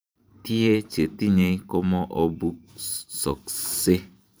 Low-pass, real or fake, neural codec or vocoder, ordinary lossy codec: none; fake; vocoder, 44.1 kHz, 128 mel bands every 512 samples, BigVGAN v2; none